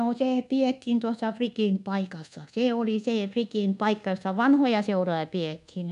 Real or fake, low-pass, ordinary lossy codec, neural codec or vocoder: fake; 10.8 kHz; none; codec, 24 kHz, 1.2 kbps, DualCodec